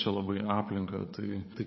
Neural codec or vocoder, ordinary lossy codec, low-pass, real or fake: codec, 16 kHz, 8 kbps, FreqCodec, larger model; MP3, 24 kbps; 7.2 kHz; fake